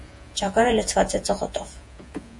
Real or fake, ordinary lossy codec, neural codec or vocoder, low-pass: fake; MP3, 48 kbps; vocoder, 48 kHz, 128 mel bands, Vocos; 10.8 kHz